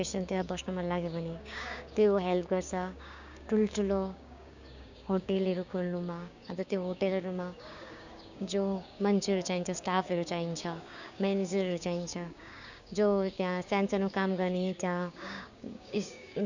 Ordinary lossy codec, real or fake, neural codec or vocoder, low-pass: none; fake; codec, 16 kHz, 6 kbps, DAC; 7.2 kHz